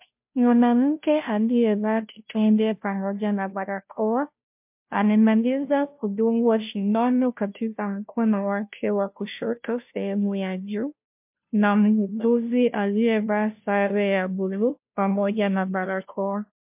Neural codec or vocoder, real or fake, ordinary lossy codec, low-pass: codec, 16 kHz, 0.5 kbps, FunCodec, trained on Chinese and English, 25 frames a second; fake; MP3, 32 kbps; 3.6 kHz